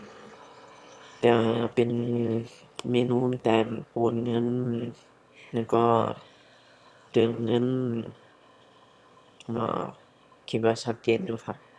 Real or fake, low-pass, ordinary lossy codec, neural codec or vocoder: fake; none; none; autoencoder, 22.05 kHz, a latent of 192 numbers a frame, VITS, trained on one speaker